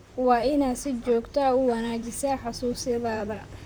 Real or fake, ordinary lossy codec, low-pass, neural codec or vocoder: fake; none; none; vocoder, 44.1 kHz, 128 mel bands, Pupu-Vocoder